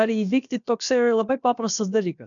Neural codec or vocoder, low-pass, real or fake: codec, 16 kHz, about 1 kbps, DyCAST, with the encoder's durations; 7.2 kHz; fake